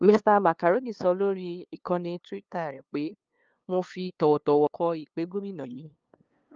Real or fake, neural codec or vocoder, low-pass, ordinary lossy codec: fake; codec, 16 kHz, 2 kbps, FunCodec, trained on LibriTTS, 25 frames a second; 7.2 kHz; Opus, 24 kbps